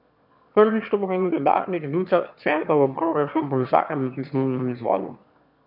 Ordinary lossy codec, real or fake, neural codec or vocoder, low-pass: none; fake; autoencoder, 22.05 kHz, a latent of 192 numbers a frame, VITS, trained on one speaker; 5.4 kHz